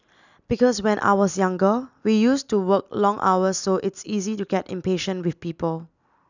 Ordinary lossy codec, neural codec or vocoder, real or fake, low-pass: none; none; real; 7.2 kHz